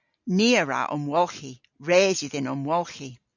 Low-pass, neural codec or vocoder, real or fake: 7.2 kHz; none; real